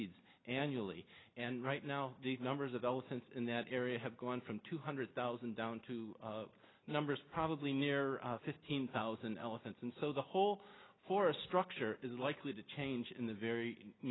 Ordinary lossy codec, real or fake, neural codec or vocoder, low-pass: AAC, 16 kbps; real; none; 7.2 kHz